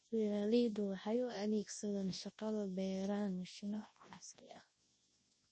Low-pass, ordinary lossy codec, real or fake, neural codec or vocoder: 9.9 kHz; MP3, 32 kbps; fake; codec, 24 kHz, 0.9 kbps, WavTokenizer, large speech release